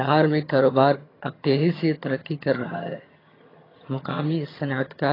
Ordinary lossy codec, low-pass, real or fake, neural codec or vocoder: AAC, 24 kbps; 5.4 kHz; fake; vocoder, 22.05 kHz, 80 mel bands, HiFi-GAN